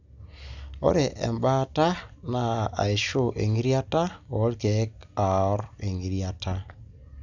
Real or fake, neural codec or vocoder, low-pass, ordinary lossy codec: real; none; 7.2 kHz; none